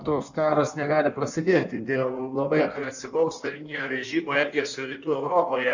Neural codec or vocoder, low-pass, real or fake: codec, 16 kHz in and 24 kHz out, 1.1 kbps, FireRedTTS-2 codec; 7.2 kHz; fake